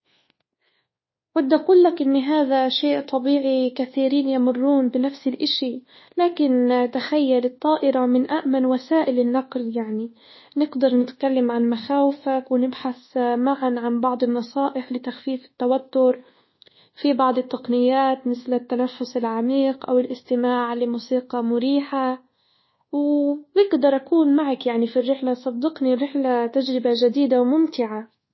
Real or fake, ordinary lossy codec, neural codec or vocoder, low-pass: fake; MP3, 24 kbps; codec, 24 kHz, 1.2 kbps, DualCodec; 7.2 kHz